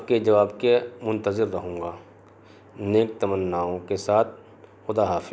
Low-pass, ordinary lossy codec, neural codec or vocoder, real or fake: none; none; none; real